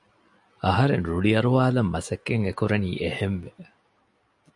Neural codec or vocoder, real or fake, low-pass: none; real; 10.8 kHz